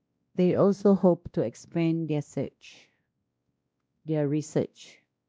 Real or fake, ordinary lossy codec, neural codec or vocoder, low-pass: fake; none; codec, 16 kHz, 1 kbps, X-Codec, WavLM features, trained on Multilingual LibriSpeech; none